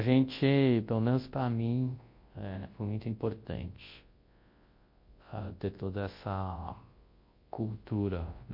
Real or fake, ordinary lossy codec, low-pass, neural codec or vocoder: fake; MP3, 32 kbps; 5.4 kHz; codec, 24 kHz, 0.9 kbps, WavTokenizer, large speech release